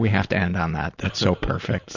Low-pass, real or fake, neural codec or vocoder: 7.2 kHz; fake; vocoder, 44.1 kHz, 128 mel bands every 512 samples, BigVGAN v2